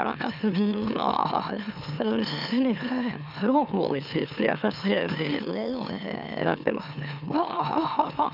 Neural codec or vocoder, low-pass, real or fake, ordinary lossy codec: autoencoder, 44.1 kHz, a latent of 192 numbers a frame, MeloTTS; 5.4 kHz; fake; none